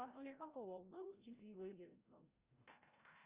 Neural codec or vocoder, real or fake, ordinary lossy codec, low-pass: codec, 16 kHz, 0.5 kbps, FreqCodec, larger model; fake; Opus, 24 kbps; 3.6 kHz